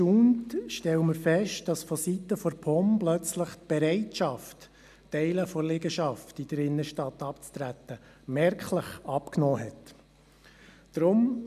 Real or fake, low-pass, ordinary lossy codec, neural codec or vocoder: real; 14.4 kHz; none; none